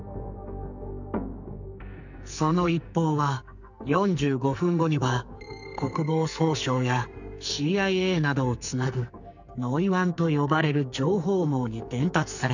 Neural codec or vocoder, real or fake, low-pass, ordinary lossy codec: codec, 44.1 kHz, 2.6 kbps, SNAC; fake; 7.2 kHz; none